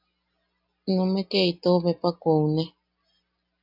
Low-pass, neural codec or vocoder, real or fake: 5.4 kHz; none; real